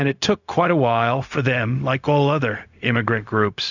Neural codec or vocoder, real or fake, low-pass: codec, 16 kHz, 0.4 kbps, LongCat-Audio-Codec; fake; 7.2 kHz